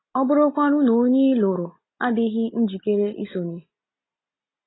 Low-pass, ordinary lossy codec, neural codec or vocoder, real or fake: 7.2 kHz; AAC, 16 kbps; none; real